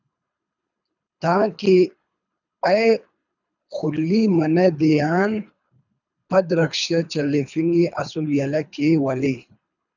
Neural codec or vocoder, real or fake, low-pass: codec, 24 kHz, 3 kbps, HILCodec; fake; 7.2 kHz